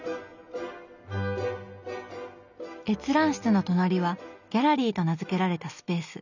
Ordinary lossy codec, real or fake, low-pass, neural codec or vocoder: none; real; 7.2 kHz; none